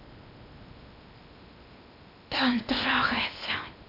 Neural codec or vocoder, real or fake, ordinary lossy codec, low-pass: codec, 16 kHz in and 24 kHz out, 0.8 kbps, FocalCodec, streaming, 65536 codes; fake; AAC, 32 kbps; 5.4 kHz